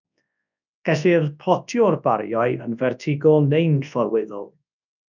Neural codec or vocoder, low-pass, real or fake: codec, 24 kHz, 0.9 kbps, WavTokenizer, large speech release; 7.2 kHz; fake